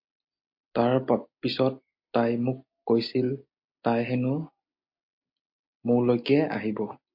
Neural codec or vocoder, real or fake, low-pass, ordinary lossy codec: none; real; 5.4 kHz; MP3, 32 kbps